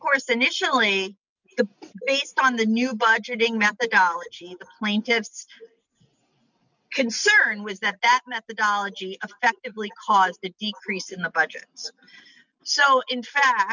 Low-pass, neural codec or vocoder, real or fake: 7.2 kHz; none; real